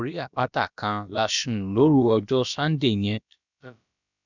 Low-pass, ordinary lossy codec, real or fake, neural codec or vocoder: 7.2 kHz; none; fake; codec, 16 kHz, about 1 kbps, DyCAST, with the encoder's durations